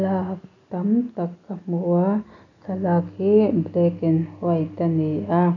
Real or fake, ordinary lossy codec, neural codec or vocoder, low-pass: real; none; none; 7.2 kHz